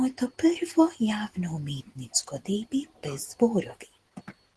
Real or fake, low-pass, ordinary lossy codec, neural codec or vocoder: real; 10.8 kHz; Opus, 16 kbps; none